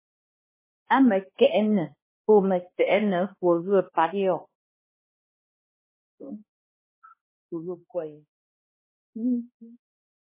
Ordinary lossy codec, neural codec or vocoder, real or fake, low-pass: MP3, 16 kbps; codec, 16 kHz, 1 kbps, X-Codec, HuBERT features, trained on balanced general audio; fake; 3.6 kHz